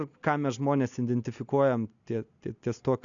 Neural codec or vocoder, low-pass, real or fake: none; 7.2 kHz; real